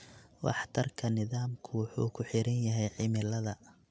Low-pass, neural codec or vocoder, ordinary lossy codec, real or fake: none; none; none; real